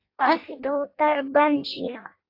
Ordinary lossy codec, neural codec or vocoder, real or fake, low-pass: AAC, 32 kbps; codec, 16 kHz in and 24 kHz out, 0.6 kbps, FireRedTTS-2 codec; fake; 5.4 kHz